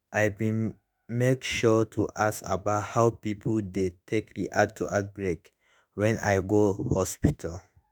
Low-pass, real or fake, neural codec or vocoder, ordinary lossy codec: none; fake; autoencoder, 48 kHz, 32 numbers a frame, DAC-VAE, trained on Japanese speech; none